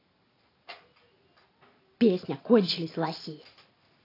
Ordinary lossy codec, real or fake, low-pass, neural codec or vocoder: AAC, 24 kbps; real; 5.4 kHz; none